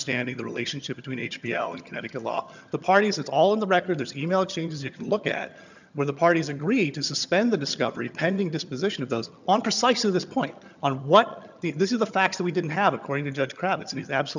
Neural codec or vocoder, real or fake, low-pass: vocoder, 22.05 kHz, 80 mel bands, HiFi-GAN; fake; 7.2 kHz